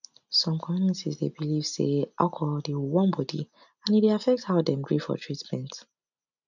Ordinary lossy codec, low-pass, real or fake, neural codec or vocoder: none; 7.2 kHz; real; none